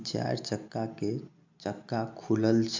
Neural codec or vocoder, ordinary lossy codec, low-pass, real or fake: none; MP3, 64 kbps; 7.2 kHz; real